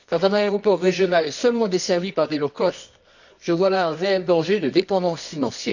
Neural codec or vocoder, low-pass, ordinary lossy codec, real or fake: codec, 24 kHz, 0.9 kbps, WavTokenizer, medium music audio release; 7.2 kHz; none; fake